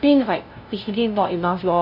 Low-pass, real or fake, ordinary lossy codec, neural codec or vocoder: 5.4 kHz; fake; none; codec, 16 kHz, 0.5 kbps, FunCodec, trained on LibriTTS, 25 frames a second